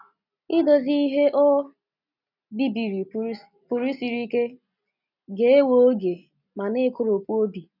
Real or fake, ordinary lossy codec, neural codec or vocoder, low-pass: real; none; none; 5.4 kHz